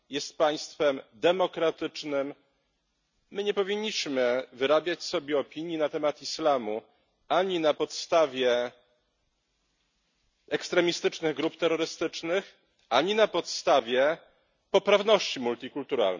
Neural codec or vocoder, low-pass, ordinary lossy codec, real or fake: none; 7.2 kHz; none; real